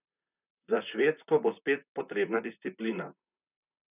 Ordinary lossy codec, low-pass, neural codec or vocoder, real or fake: none; 3.6 kHz; vocoder, 44.1 kHz, 128 mel bands, Pupu-Vocoder; fake